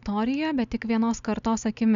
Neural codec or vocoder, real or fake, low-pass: none; real; 7.2 kHz